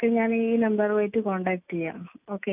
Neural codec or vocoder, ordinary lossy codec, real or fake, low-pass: none; none; real; 3.6 kHz